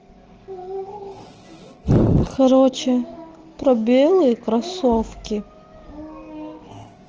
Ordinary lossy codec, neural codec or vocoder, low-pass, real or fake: Opus, 16 kbps; none; 7.2 kHz; real